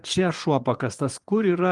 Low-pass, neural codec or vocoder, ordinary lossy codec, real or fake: 10.8 kHz; vocoder, 24 kHz, 100 mel bands, Vocos; Opus, 24 kbps; fake